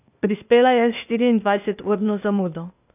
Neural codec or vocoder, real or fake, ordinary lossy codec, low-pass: codec, 16 kHz, 0.8 kbps, ZipCodec; fake; none; 3.6 kHz